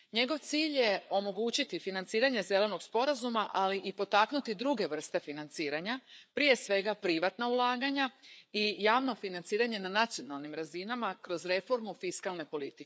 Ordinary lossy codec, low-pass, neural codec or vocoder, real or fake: none; none; codec, 16 kHz, 4 kbps, FreqCodec, larger model; fake